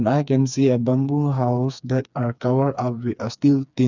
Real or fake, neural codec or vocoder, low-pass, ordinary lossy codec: fake; codec, 16 kHz, 4 kbps, FreqCodec, smaller model; 7.2 kHz; none